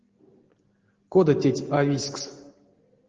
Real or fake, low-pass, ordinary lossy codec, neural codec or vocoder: real; 7.2 kHz; Opus, 16 kbps; none